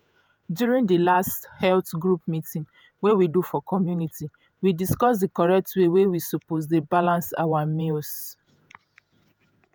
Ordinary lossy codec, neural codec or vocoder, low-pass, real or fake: none; vocoder, 48 kHz, 128 mel bands, Vocos; none; fake